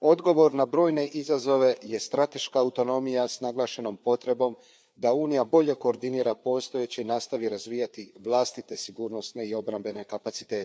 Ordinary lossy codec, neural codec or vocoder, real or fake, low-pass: none; codec, 16 kHz, 4 kbps, FreqCodec, larger model; fake; none